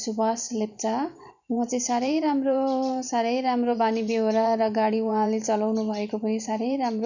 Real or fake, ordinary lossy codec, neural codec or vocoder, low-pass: fake; none; vocoder, 44.1 kHz, 128 mel bands every 512 samples, BigVGAN v2; 7.2 kHz